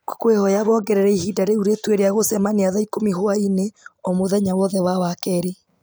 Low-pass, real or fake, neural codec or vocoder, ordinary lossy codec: none; real; none; none